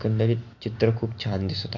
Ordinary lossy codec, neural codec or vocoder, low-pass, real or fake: MP3, 48 kbps; none; 7.2 kHz; real